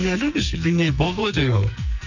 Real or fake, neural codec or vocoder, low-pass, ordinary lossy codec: fake; codec, 44.1 kHz, 2.6 kbps, SNAC; 7.2 kHz; none